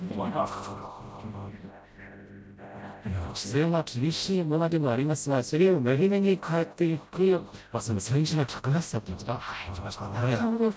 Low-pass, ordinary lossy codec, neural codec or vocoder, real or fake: none; none; codec, 16 kHz, 0.5 kbps, FreqCodec, smaller model; fake